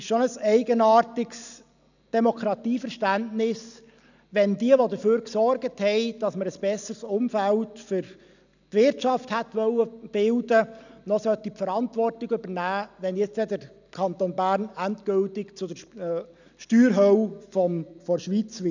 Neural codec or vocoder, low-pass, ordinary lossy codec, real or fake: none; 7.2 kHz; none; real